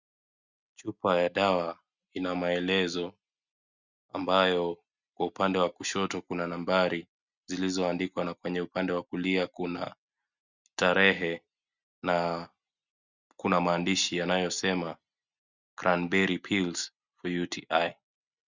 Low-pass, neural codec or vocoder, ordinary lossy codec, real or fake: 7.2 kHz; none; Opus, 64 kbps; real